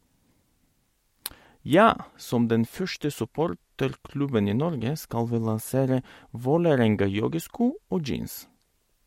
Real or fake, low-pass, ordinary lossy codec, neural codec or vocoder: real; 19.8 kHz; MP3, 64 kbps; none